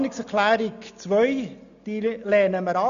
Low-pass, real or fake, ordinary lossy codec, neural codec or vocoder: 7.2 kHz; real; none; none